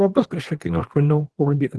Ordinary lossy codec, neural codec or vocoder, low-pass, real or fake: Opus, 16 kbps; codec, 24 kHz, 0.9 kbps, WavTokenizer, small release; 10.8 kHz; fake